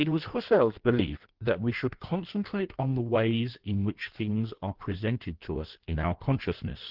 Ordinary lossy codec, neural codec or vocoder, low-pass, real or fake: Opus, 16 kbps; codec, 16 kHz in and 24 kHz out, 1.1 kbps, FireRedTTS-2 codec; 5.4 kHz; fake